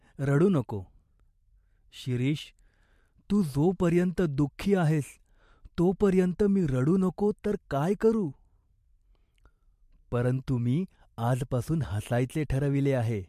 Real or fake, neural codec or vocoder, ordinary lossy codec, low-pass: fake; vocoder, 44.1 kHz, 128 mel bands every 512 samples, BigVGAN v2; MP3, 64 kbps; 14.4 kHz